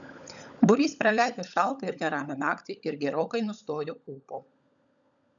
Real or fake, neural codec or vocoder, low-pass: fake; codec, 16 kHz, 16 kbps, FunCodec, trained on LibriTTS, 50 frames a second; 7.2 kHz